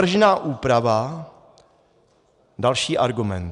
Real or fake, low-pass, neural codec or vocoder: real; 10.8 kHz; none